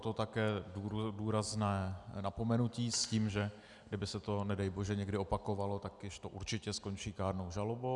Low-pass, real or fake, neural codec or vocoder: 10.8 kHz; real; none